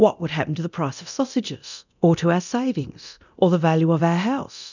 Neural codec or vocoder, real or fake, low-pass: codec, 24 kHz, 0.9 kbps, DualCodec; fake; 7.2 kHz